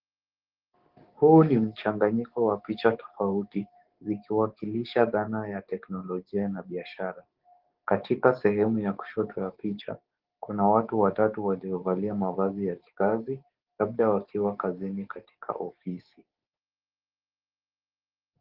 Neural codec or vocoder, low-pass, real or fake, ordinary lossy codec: codec, 44.1 kHz, 7.8 kbps, DAC; 5.4 kHz; fake; Opus, 16 kbps